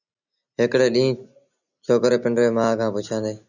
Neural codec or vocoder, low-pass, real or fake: none; 7.2 kHz; real